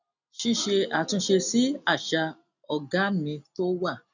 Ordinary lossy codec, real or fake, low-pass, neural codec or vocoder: none; real; 7.2 kHz; none